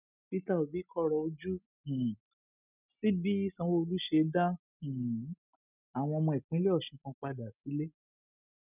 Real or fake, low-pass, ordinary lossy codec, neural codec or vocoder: real; 3.6 kHz; none; none